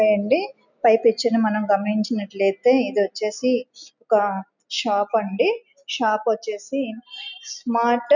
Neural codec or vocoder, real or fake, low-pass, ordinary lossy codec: none; real; 7.2 kHz; none